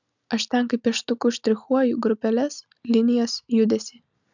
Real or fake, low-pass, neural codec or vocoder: real; 7.2 kHz; none